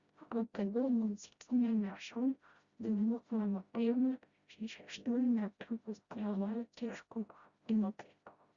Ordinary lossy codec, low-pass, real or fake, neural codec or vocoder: Opus, 64 kbps; 7.2 kHz; fake; codec, 16 kHz, 0.5 kbps, FreqCodec, smaller model